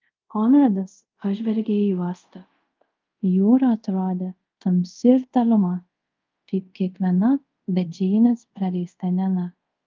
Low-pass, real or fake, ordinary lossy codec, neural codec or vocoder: 7.2 kHz; fake; Opus, 24 kbps; codec, 24 kHz, 0.5 kbps, DualCodec